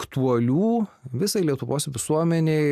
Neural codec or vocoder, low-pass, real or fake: none; 14.4 kHz; real